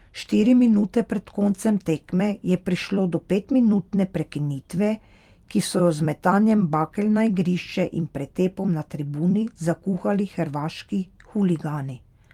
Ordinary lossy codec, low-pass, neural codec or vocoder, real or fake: Opus, 32 kbps; 19.8 kHz; vocoder, 44.1 kHz, 128 mel bands every 256 samples, BigVGAN v2; fake